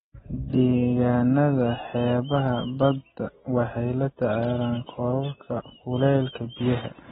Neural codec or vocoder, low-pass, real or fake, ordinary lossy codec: none; 19.8 kHz; real; AAC, 16 kbps